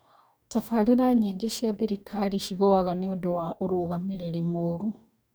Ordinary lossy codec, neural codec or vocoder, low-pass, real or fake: none; codec, 44.1 kHz, 2.6 kbps, DAC; none; fake